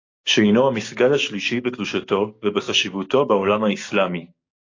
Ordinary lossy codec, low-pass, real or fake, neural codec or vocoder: AAC, 32 kbps; 7.2 kHz; fake; codec, 16 kHz, 6 kbps, DAC